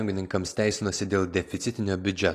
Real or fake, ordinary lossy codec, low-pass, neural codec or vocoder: real; AAC, 48 kbps; 14.4 kHz; none